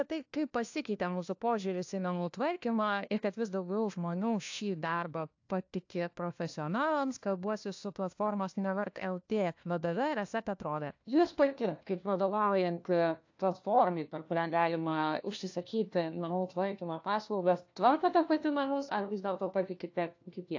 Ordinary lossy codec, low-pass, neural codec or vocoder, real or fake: AAC, 48 kbps; 7.2 kHz; codec, 16 kHz, 1 kbps, FunCodec, trained on LibriTTS, 50 frames a second; fake